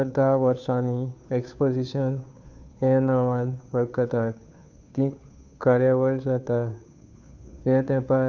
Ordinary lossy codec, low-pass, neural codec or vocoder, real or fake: none; 7.2 kHz; codec, 16 kHz, 2 kbps, FunCodec, trained on Chinese and English, 25 frames a second; fake